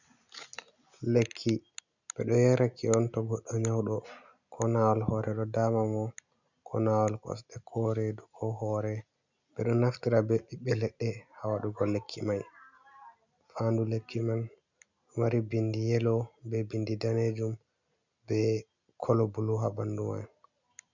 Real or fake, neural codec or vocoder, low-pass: real; none; 7.2 kHz